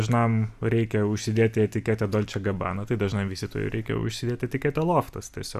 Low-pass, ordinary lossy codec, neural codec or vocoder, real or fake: 14.4 kHz; Opus, 64 kbps; none; real